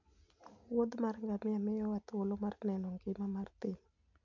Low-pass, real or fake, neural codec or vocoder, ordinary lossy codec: 7.2 kHz; real; none; none